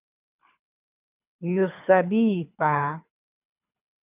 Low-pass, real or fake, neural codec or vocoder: 3.6 kHz; fake; codec, 24 kHz, 3 kbps, HILCodec